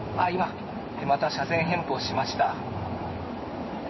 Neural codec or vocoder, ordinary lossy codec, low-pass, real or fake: codec, 16 kHz in and 24 kHz out, 1 kbps, XY-Tokenizer; MP3, 24 kbps; 7.2 kHz; fake